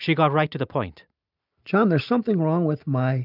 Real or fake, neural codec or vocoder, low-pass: real; none; 5.4 kHz